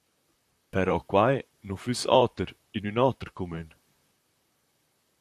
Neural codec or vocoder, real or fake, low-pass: vocoder, 44.1 kHz, 128 mel bands, Pupu-Vocoder; fake; 14.4 kHz